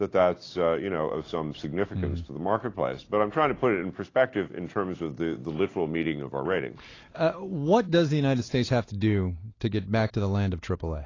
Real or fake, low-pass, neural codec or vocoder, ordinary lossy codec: real; 7.2 kHz; none; AAC, 32 kbps